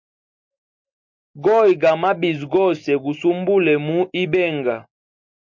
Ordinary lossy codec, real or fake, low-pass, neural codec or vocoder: MP3, 48 kbps; real; 7.2 kHz; none